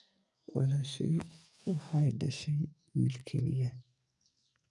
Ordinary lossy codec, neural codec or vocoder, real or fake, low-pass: none; codec, 44.1 kHz, 2.6 kbps, SNAC; fake; 10.8 kHz